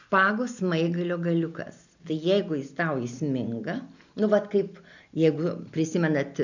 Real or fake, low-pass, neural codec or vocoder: real; 7.2 kHz; none